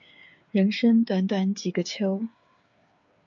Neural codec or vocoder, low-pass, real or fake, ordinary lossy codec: codec, 16 kHz, 8 kbps, FreqCodec, smaller model; 7.2 kHz; fake; AAC, 64 kbps